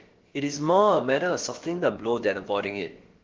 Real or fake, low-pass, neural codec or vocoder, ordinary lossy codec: fake; 7.2 kHz; codec, 16 kHz, about 1 kbps, DyCAST, with the encoder's durations; Opus, 16 kbps